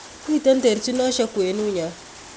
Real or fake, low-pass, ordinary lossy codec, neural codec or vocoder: real; none; none; none